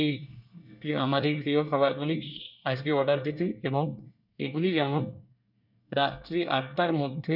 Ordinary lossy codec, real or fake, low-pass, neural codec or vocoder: none; fake; 5.4 kHz; codec, 24 kHz, 1 kbps, SNAC